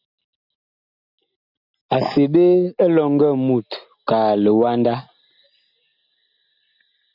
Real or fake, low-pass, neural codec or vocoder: real; 5.4 kHz; none